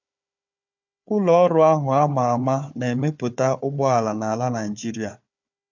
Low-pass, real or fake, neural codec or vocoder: 7.2 kHz; fake; codec, 16 kHz, 4 kbps, FunCodec, trained on Chinese and English, 50 frames a second